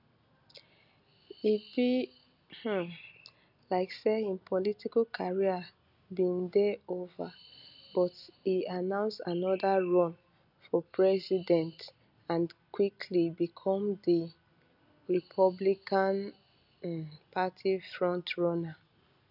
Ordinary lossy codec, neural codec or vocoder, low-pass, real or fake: none; none; 5.4 kHz; real